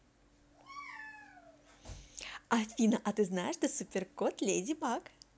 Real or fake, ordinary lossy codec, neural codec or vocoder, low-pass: real; none; none; none